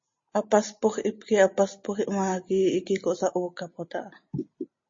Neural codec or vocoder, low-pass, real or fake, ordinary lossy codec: none; 7.2 kHz; real; MP3, 32 kbps